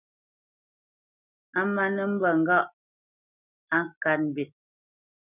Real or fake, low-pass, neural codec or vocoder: real; 3.6 kHz; none